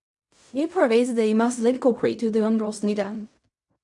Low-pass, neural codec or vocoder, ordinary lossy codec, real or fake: 10.8 kHz; codec, 16 kHz in and 24 kHz out, 0.4 kbps, LongCat-Audio-Codec, fine tuned four codebook decoder; none; fake